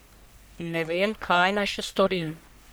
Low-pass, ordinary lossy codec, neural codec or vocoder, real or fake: none; none; codec, 44.1 kHz, 1.7 kbps, Pupu-Codec; fake